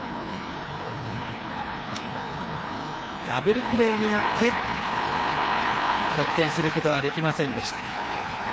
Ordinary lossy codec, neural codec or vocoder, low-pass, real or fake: none; codec, 16 kHz, 2 kbps, FreqCodec, larger model; none; fake